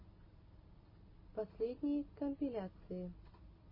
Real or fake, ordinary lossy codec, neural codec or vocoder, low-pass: real; MP3, 24 kbps; none; 5.4 kHz